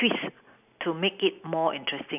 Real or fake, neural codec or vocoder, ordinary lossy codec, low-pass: real; none; none; 3.6 kHz